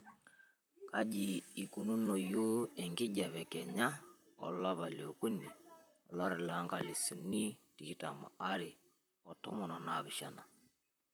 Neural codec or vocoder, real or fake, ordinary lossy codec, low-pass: vocoder, 44.1 kHz, 128 mel bands, Pupu-Vocoder; fake; none; none